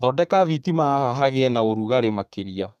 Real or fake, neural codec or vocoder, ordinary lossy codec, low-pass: fake; codec, 32 kHz, 1.9 kbps, SNAC; none; 14.4 kHz